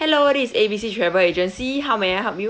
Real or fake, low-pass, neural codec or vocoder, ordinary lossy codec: real; none; none; none